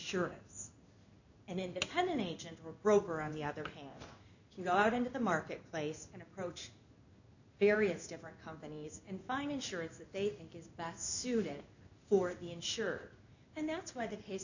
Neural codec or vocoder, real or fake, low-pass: codec, 16 kHz in and 24 kHz out, 1 kbps, XY-Tokenizer; fake; 7.2 kHz